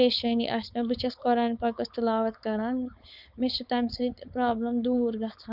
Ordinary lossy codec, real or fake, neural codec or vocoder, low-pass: none; fake; codec, 16 kHz, 4.8 kbps, FACodec; 5.4 kHz